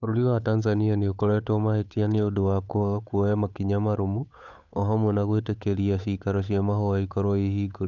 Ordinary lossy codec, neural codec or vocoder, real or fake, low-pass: none; codec, 16 kHz, 8 kbps, FunCodec, trained on Chinese and English, 25 frames a second; fake; 7.2 kHz